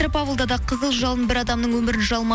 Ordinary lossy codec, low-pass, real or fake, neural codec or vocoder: none; none; real; none